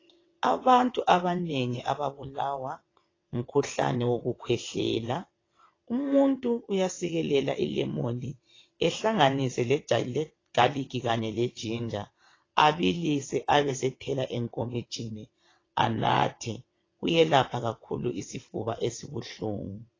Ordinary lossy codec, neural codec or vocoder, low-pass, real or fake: AAC, 32 kbps; vocoder, 22.05 kHz, 80 mel bands, WaveNeXt; 7.2 kHz; fake